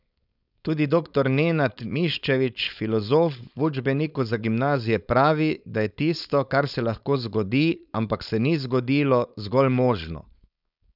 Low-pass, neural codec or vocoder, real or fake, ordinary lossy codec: 5.4 kHz; codec, 16 kHz, 4.8 kbps, FACodec; fake; none